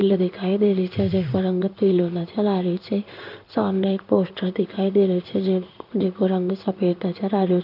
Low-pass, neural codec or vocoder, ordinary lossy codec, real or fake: 5.4 kHz; codec, 16 kHz in and 24 kHz out, 1 kbps, XY-Tokenizer; none; fake